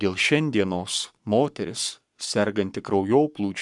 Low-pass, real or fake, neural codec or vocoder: 10.8 kHz; fake; codec, 44.1 kHz, 7.8 kbps, Pupu-Codec